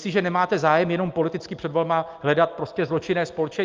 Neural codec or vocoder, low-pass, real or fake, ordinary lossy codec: none; 7.2 kHz; real; Opus, 24 kbps